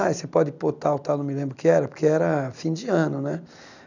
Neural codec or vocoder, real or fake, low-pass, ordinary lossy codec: none; real; 7.2 kHz; none